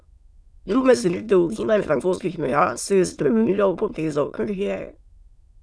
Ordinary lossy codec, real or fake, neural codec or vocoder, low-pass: none; fake; autoencoder, 22.05 kHz, a latent of 192 numbers a frame, VITS, trained on many speakers; none